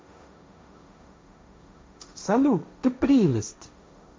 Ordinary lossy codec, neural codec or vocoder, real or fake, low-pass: none; codec, 16 kHz, 1.1 kbps, Voila-Tokenizer; fake; none